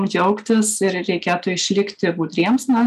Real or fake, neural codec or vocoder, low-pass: real; none; 14.4 kHz